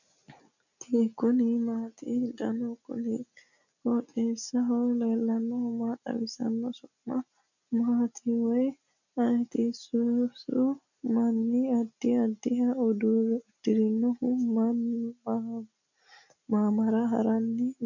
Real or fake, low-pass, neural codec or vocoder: real; 7.2 kHz; none